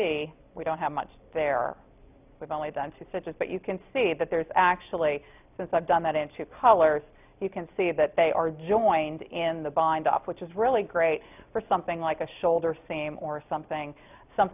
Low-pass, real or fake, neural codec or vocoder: 3.6 kHz; real; none